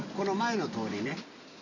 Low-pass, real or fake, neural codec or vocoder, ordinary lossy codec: 7.2 kHz; real; none; none